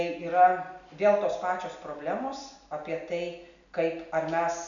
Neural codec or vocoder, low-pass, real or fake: none; 7.2 kHz; real